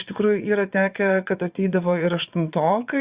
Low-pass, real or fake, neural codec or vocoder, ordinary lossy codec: 3.6 kHz; fake; vocoder, 22.05 kHz, 80 mel bands, Vocos; Opus, 64 kbps